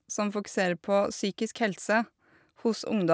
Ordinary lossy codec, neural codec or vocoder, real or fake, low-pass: none; none; real; none